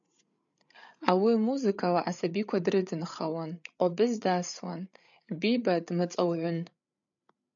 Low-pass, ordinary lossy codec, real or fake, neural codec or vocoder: 7.2 kHz; MP3, 96 kbps; fake; codec, 16 kHz, 8 kbps, FreqCodec, larger model